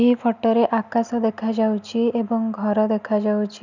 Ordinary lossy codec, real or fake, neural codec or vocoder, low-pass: none; real; none; 7.2 kHz